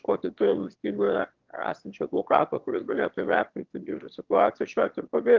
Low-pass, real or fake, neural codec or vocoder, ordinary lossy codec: 7.2 kHz; fake; autoencoder, 22.05 kHz, a latent of 192 numbers a frame, VITS, trained on one speaker; Opus, 16 kbps